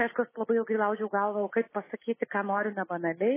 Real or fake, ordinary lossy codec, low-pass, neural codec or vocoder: real; MP3, 16 kbps; 3.6 kHz; none